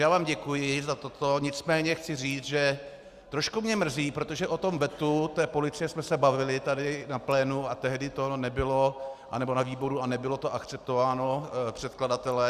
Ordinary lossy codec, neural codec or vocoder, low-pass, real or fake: Opus, 64 kbps; none; 14.4 kHz; real